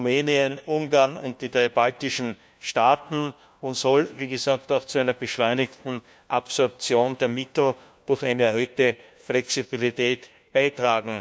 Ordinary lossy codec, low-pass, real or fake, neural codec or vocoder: none; none; fake; codec, 16 kHz, 1 kbps, FunCodec, trained on LibriTTS, 50 frames a second